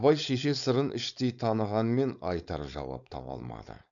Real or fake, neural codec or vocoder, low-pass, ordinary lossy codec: fake; codec, 16 kHz, 4.8 kbps, FACodec; 7.2 kHz; none